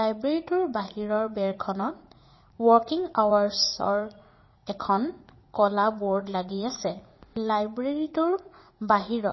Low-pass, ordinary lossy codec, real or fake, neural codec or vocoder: 7.2 kHz; MP3, 24 kbps; fake; vocoder, 44.1 kHz, 80 mel bands, Vocos